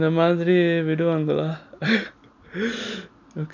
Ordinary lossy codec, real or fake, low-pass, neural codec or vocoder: none; real; 7.2 kHz; none